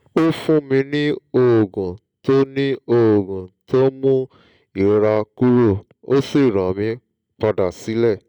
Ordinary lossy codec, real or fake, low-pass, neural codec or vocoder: none; real; 19.8 kHz; none